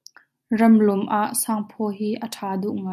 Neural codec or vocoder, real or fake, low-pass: none; real; 14.4 kHz